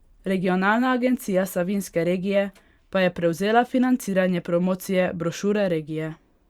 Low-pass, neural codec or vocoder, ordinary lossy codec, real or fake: 19.8 kHz; vocoder, 44.1 kHz, 128 mel bands every 512 samples, BigVGAN v2; Opus, 64 kbps; fake